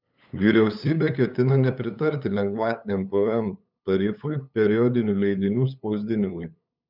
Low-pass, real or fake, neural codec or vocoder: 5.4 kHz; fake; codec, 16 kHz, 8 kbps, FunCodec, trained on LibriTTS, 25 frames a second